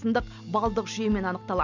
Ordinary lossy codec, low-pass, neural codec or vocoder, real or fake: none; 7.2 kHz; none; real